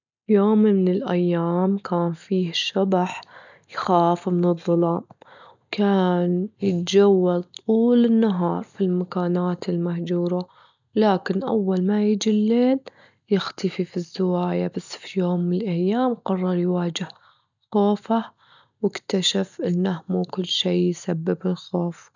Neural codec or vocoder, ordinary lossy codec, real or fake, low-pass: none; none; real; 7.2 kHz